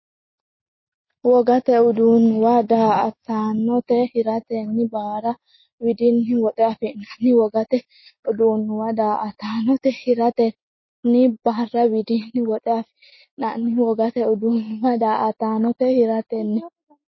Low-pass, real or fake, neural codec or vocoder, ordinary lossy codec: 7.2 kHz; real; none; MP3, 24 kbps